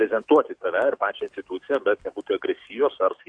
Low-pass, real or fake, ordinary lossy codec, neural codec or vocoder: 9.9 kHz; fake; MP3, 48 kbps; codec, 44.1 kHz, 7.8 kbps, DAC